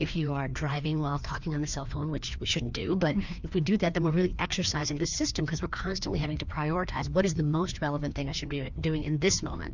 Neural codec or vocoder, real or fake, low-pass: codec, 16 kHz, 2 kbps, FreqCodec, larger model; fake; 7.2 kHz